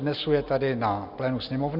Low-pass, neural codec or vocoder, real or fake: 5.4 kHz; none; real